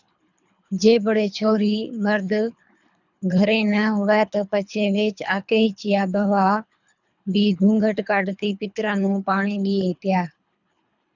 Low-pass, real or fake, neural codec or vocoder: 7.2 kHz; fake; codec, 24 kHz, 3 kbps, HILCodec